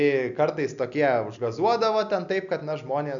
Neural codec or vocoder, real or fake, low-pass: none; real; 7.2 kHz